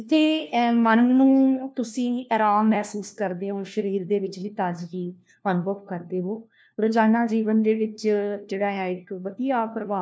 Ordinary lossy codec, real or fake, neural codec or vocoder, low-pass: none; fake; codec, 16 kHz, 1 kbps, FunCodec, trained on LibriTTS, 50 frames a second; none